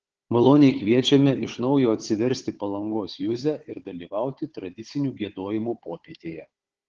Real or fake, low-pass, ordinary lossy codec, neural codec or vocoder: fake; 7.2 kHz; Opus, 16 kbps; codec, 16 kHz, 4 kbps, FunCodec, trained on Chinese and English, 50 frames a second